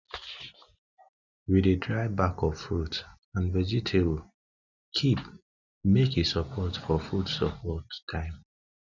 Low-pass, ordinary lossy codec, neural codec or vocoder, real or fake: 7.2 kHz; none; none; real